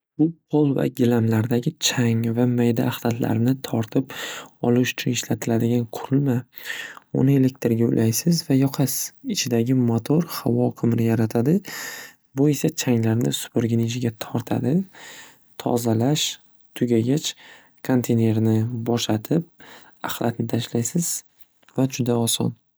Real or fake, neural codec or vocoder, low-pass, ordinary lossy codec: real; none; none; none